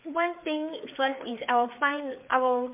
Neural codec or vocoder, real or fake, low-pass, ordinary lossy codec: codec, 16 kHz, 4 kbps, FreqCodec, larger model; fake; 3.6 kHz; MP3, 32 kbps